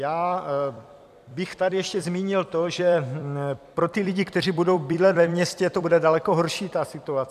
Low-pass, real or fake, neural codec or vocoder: 14.4 kHz; fake; vocoder, 44.1 kHz, 128 mel bands every 256 samples, BigVGAN v2